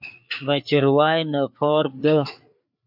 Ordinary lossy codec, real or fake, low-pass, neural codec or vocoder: MP3, 48 kbps; fake; 5.4 kHz; codec, 16 kHz, 4 kbps, FreqCodec, larger model